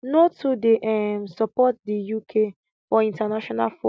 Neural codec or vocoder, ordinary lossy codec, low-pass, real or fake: none; none; none; real